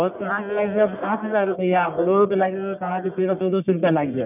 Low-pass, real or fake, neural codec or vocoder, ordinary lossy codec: 3.6 kHz; fake; codec, 44.1 kHz, 1.7 kbps, Pupu-Codec; none